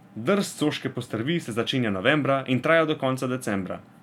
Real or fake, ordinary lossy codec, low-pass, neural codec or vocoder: real; none; 19.8 kHz; none